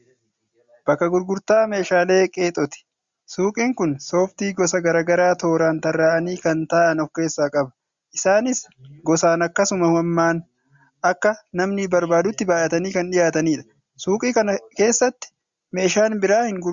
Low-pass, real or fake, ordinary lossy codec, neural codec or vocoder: 7.2 kHz; real; Opus, 64 kbps; none